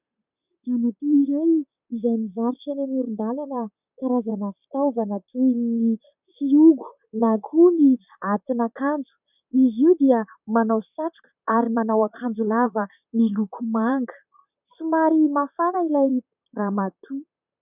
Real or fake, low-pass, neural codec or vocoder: fake; 3.6 kHz; vocoder, 44.1 kHz, 128 mel bands, Pupu-Vocoder